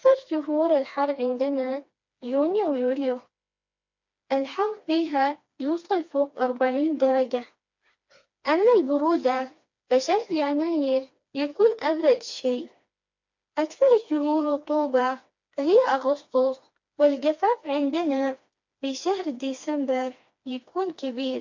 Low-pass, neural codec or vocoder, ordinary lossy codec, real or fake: 7.2 kHz; codec, 16 kHz, 2 kbps, FreqCodec, smaller model; MP3, 48 kbps; fake